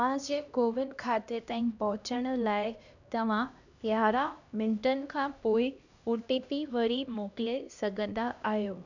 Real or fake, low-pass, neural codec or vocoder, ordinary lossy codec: fake; 7.2 kHz; codec, 16 kHz, 1 kbps, X-Codec, HuBERT features, trained on LibriSpeech; none